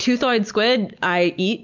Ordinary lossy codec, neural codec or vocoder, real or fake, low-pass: MP3, 64 kbps; none; real; 7.2 kHz